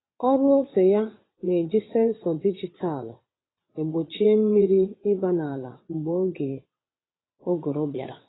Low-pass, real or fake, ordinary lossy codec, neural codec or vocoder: 7.2 kHz; fake; AAC, 16 kbps; vocoder, 22.05 kHz, 80 mel bands, WaveNeXt